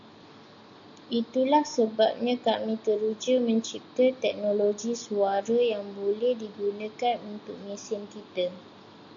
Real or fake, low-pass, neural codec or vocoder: real; 7.2 kHz; none